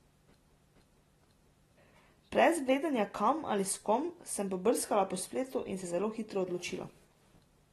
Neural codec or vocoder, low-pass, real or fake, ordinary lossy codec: none; 19.8 kHz; real; AAC, 32 kbps